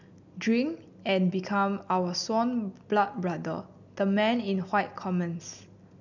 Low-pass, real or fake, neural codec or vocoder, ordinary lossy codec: 7.2 kHz; real; none; none